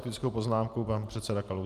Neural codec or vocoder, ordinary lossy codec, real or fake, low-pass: none; Opus, 32 kbps; real; 14.4 kHz